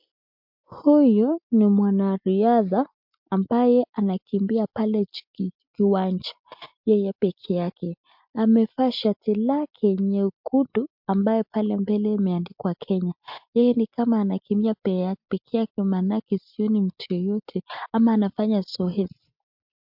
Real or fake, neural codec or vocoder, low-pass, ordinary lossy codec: real; none; 5.4 kHz; MP3, 48 kbps